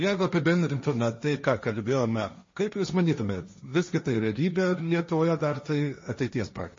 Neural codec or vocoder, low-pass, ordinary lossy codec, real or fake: codec, 16 kHz, 1.1 kbps, Voila-Tokenizer; 7.2 kHz; MP3, 32 kbps; fake